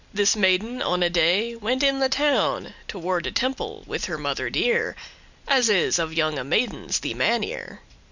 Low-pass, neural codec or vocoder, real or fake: 7.2 kHz; none; real